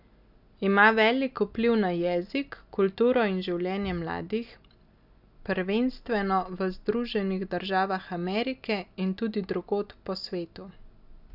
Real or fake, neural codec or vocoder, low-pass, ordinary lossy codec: real; none; 5.4 kHz; none